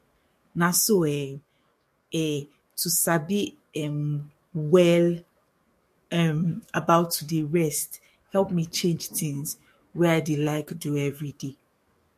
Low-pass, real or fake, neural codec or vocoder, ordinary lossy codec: 14.4 kHz; fake; codec, 44.1 kHz, 7.8 kbps, DAC; MP3, 64 kbps